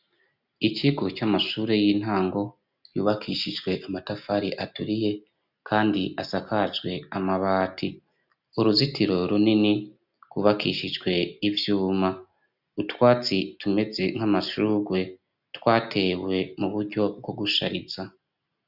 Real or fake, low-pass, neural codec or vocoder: real; 5.4 kHz; none